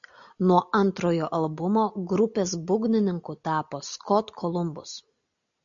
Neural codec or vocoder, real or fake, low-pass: none; real; 7.2 kHz